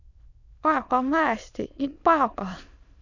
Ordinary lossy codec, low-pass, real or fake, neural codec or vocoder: none; 7.2 kHz; fake; autoencoder, 22.05 kHz, a latent of 192 numbers a frame, VITS, trained on many speakers